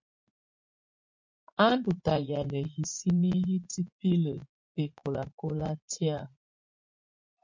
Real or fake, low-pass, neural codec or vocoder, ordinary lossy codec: fake; 7.2 kHz; vocoder, 44.1 kHz, 80 mel bands, Vocos; MP3, 48 kbps